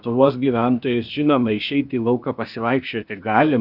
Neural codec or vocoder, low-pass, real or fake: codec, 16 kHz, 0.8 kbps, ZipCodec; 5.4 kHz; fake